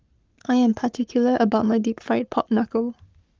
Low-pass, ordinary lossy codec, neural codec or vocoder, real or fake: 7.2 kHz; Opus, 24 kbps; codec, 44.1 kHz, 7.8 kbps, Pupu-Codec; fake